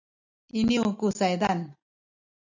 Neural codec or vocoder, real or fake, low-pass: none; real; 7.2 kHz